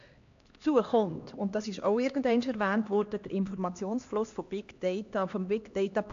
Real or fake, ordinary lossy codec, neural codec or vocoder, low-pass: fake; AAC, 48 kbps; codec, 16 kHz, 2 kbps, X-Codec, HuBERT features, trained on LibriSpeech; 7.2 kHz